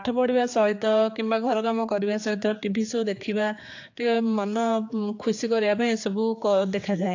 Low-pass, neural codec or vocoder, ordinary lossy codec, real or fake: 7.2 kHz; codec, 16 kHz, 4 kbps, X-Codec, HuBERT features, trained on general audio; AAC, 48 kbps; fake